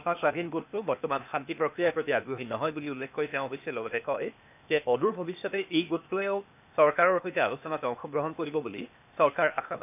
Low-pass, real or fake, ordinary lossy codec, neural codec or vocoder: 3.6 kHz; fake; none; codec, 16 kHz, 0.8 kbps, ZipCodec